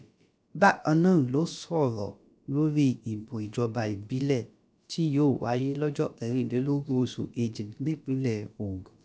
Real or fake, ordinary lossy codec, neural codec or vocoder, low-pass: fake; none; codec, 16 kHz, about 1 kbps, DyCAST, with the encoder's durations; none